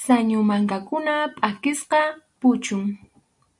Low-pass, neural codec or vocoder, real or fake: 10.8 kHz; none; real